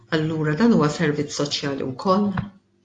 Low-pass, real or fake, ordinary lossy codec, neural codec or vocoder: 9.9 kHz; real; AAC, 48 kbps; none